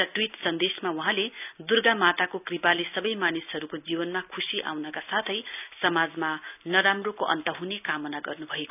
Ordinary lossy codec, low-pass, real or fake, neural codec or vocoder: none; 3.6 kHz; real; none